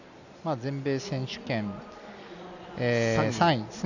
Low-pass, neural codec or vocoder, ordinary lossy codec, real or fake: 7.2 kHz; none; none; real